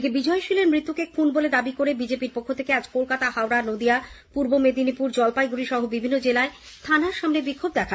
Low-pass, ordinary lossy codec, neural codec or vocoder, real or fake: none; none; none; real